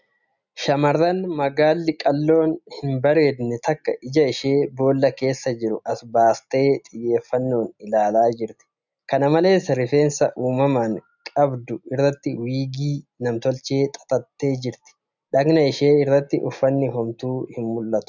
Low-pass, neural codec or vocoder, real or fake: 7.2 kHz; none; real